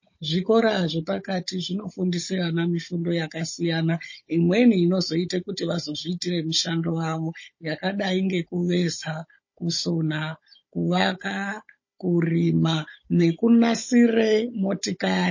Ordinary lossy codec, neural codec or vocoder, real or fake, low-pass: MP3, 32 kbps; codec, 16 kHz, 16 kbps, FunCodec, trained on Chinese and English, 50 frames a second; fake; 7.2 kHz